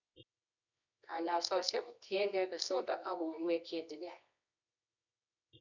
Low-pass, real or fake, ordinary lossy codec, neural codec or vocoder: 7.2 kHz; fake; none; codec, 24 kHz, 0.9 kbps, WavTokenizer, medium music audio release